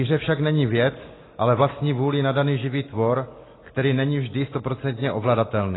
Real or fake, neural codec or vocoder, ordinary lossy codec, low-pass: real; none; AAC, 16 kbps; 7.2 kHz